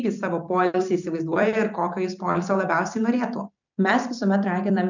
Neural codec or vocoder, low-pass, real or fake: vocoder, 44.1 kHz, 128 mel bands every 256 samples, BigVGAN v2; 7.2 kHz; fake